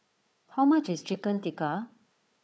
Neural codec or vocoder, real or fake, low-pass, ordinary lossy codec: codec, 16 kHz, 4 kbps, FunCodec, trained on Chinese and English, 50 frames a second; fake; none; none